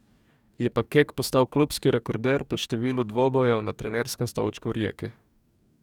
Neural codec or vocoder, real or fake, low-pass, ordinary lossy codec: codec, 44.1 kHz, 2.6 kbps, DAC; fake; 19.8 kHz; none